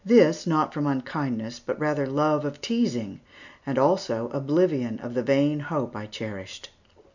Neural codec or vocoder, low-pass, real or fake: none; 7.2 kHz; real